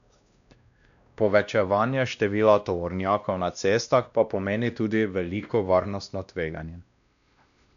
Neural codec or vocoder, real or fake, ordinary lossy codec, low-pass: codec, 16 kHz, 1 kbps, X-Codec, WavLM features, trained on Multilingual LibriSpeech; fake; none; 7.2 kHz